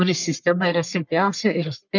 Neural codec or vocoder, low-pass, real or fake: codec, 44.1 kHz, 1.7 kbps, Pupu-Codec; 7.2 kHz; fake